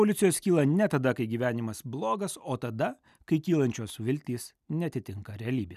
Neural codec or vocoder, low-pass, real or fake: none; 14.4 kHz; real